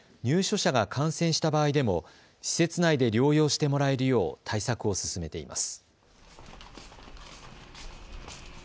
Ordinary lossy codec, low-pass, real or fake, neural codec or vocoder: none; none; real; none